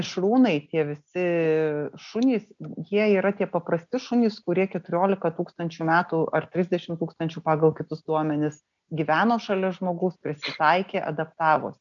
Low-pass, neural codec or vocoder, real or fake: 7.2 kHz; none; real